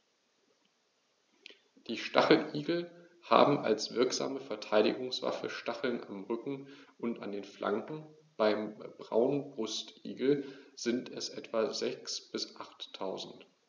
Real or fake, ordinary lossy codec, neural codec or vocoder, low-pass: real; none; none; none